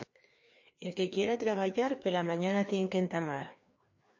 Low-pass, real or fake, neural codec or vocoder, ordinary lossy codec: 7.2 kHz; fake; codec, 16 kHz, 2 kbps, FreqCodec, larger model; MP3, 48 kbps